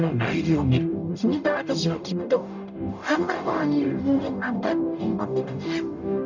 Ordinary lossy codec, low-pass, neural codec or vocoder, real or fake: none; 7.2 kHz; codec, 44.1 kHz, 0.9 kbps, DAC; fake